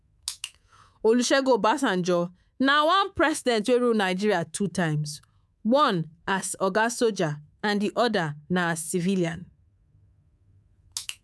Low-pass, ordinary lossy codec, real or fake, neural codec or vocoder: none; none; fake; codec, 24 kHz, 3.1 kbps, DualCodec